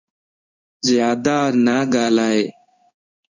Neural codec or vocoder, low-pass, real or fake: codec, 16 kHz in and 24 kHz out, 1 kbps, XY-Tokenizer; 7.2 kHz; fake